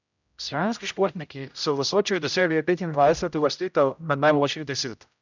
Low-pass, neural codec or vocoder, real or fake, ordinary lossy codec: 7.2 kHz; codec, 16 kHz, 0.5 kbps, X-Codec, HuBERT features, trained on general audio; fake; none